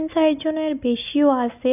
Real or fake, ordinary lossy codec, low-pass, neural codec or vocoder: real; none; 3.6 kHz; none